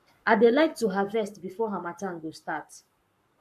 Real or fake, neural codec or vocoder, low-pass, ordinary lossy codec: fake; codec, 44.1 kHz, 7.8 kbps, Pupu-Codec; 14.4 kHz; MP3, 64 kbps